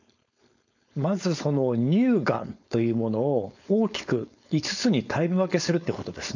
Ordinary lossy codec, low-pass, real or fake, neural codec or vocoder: none; 7.2 kHz; fake; codec, 16 kHz, 4.8 kbps, FACodec